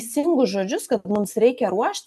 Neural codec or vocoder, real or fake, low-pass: none; real; 14.4 kHz